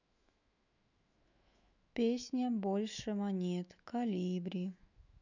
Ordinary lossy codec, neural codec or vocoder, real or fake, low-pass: none; autoencoder, 48 kHz, 128 numbers a frame, DAC-VAE, trained on Japanese speech; fake; 7.2 kHz